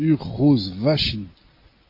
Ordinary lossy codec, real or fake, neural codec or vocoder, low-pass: MP3, 32 kbps; real; none; 5.4 kHz